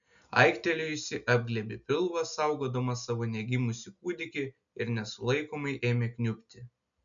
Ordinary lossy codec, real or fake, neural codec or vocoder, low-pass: MP3, 96 kbps; real; none; 7.2 kHz